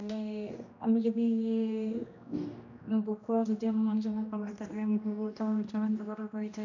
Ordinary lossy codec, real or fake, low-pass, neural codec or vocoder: none; fake; 7.2 kHz; codec, 16 kHz, 1 kbps, X-Codec, HuBERT features, trained on general audio